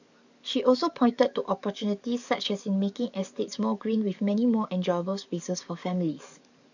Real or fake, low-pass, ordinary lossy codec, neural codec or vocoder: fake; 7.2 kHz; none; codec, 44.1 kHz, 7.8 kbps, DAC